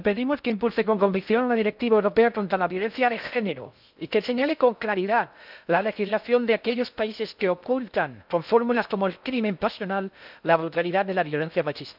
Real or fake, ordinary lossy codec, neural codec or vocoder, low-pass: fake; none; codec, 16 kHz in and 24 kHz out, 0.6 kbps, FocalCodec, streaming, 2048 codes; 5.4 kHz